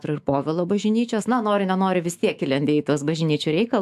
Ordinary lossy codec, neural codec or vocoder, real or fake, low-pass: AAC, 96 kbps; autoencoder, 48 kHz, 128 numbers a frame, DAC-VAE, trained on Japanese speech; fake; 14.4 kHz